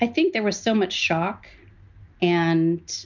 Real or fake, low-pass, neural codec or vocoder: real; 7.2 kHz; none